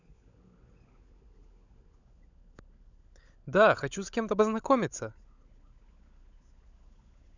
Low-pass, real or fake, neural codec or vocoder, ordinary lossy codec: 7.2 kHz; fake; codec, 16 kHz, 16 kbps, FunCodec, trained on LibriTTS, 50 frames a second; none